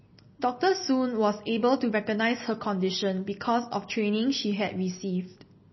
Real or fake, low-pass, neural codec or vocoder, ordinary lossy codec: real; 7.2 kHz; none; MP3, 24 kbps